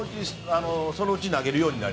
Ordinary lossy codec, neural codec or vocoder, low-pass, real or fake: none; none; none; real